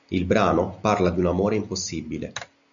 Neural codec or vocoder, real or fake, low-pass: none; real; 7.2 kHz